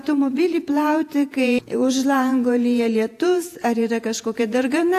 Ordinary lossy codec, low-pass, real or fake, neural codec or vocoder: AAC, 64 kbps; 14.4 kHz; fake; vocoder, 48 kHz, 128 mel bands, Vocos